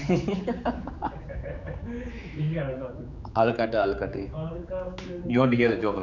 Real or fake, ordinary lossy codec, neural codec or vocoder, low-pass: fake; none; codec, 16 kHz, 4 kbps, X-Codec, HuBERT features, trained on general audio; 7.2 kHz